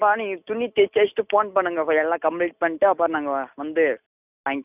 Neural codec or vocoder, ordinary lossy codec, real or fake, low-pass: none; none; real; 3.6 kHz